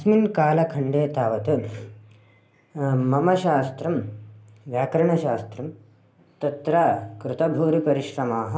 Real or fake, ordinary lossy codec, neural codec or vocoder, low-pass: real; none; none; none